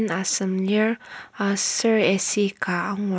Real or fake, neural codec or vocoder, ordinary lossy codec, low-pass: real; none; none; none